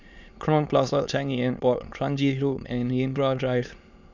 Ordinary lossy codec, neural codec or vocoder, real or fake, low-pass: none; autoencoder, 22.05 kHz, a latent of 192 numbers a frame, VITS, trained on many speakers; fake; 7.2 kHz